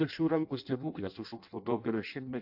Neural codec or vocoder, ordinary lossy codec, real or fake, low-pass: codec, 16 kHz in and 24 kHz out, 0.6 kbps, FireRedTTS-2 codec; AAC, 48 kbps; fake; 5.4 kHz